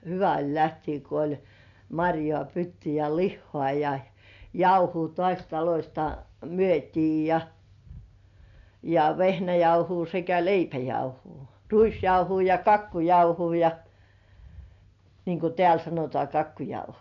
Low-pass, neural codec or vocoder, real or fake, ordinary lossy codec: 7.2 kHz; none; real; none